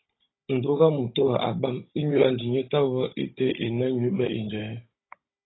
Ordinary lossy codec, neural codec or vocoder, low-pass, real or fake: AAC, 16 kbps; codec, 16 kHz, 16 kbps, FunCodec, trained on Chinese and English, 50 frames a second; 7.2 kHz; fake